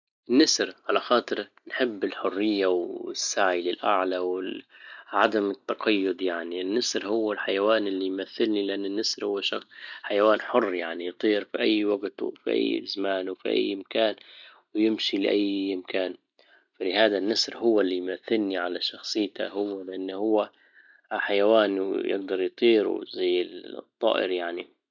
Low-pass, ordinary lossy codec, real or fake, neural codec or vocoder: 7.2 kHz; none; real; none